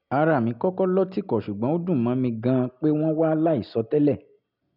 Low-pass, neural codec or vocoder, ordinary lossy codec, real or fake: 5.4 kHz; none; none; real